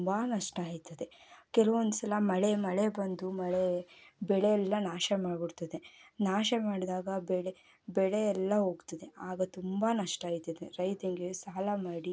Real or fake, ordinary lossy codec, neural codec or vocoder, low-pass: real; none; none; none